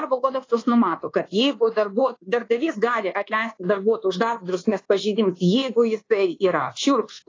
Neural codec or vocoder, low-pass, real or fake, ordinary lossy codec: codec, 24 kHz, 1.2 kbps, DualCodec; 7.2 kHz; fake; AAC, 32 kbps